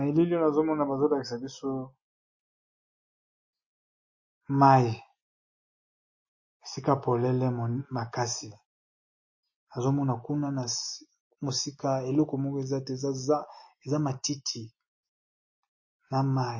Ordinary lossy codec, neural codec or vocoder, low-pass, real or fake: MP3, 32 kbps; none; 7.2 kHz; real